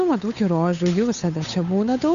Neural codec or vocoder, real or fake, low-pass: codec, 16 kHz, 8 kbps, FunCodec, trained on Chinese and English, 25 frames a second; fake; 7.2 kHz